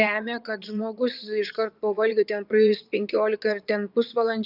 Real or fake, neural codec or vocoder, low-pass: fake; vocoder, 22.05 kHz, 80 mel bands, Vocos; 5.4 kHz